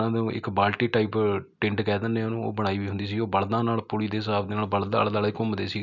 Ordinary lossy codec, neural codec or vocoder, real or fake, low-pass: none; none; real; 7.2 kHz